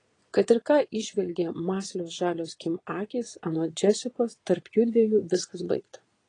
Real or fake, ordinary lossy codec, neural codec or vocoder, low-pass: fake; AAC, 32 kbps; vocoder, 22.05 kHz, 80 mel bands, Vocos; 9.9 kHz